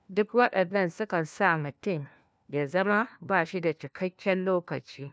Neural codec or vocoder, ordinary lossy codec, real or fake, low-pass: codec, 16 kHz, 1 kbps, FunCodec, trained on LibriTTS, 50 frames a second; none; fake; none